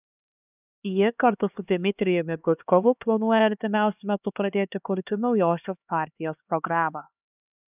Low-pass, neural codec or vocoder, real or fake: 3.6 kHz; codec, 16 kHz, 2 kbps, X-Codec, HuBERT features, trained on LibriSpeech; fake